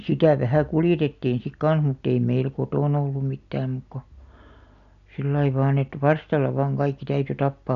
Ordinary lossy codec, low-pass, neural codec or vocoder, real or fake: none; 7.2 kHz; none; real